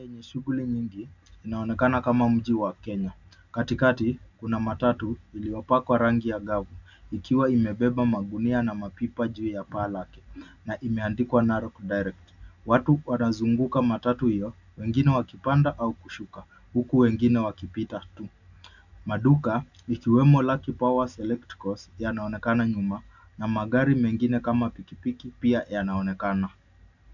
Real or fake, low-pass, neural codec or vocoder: real; 7.2 kHz; none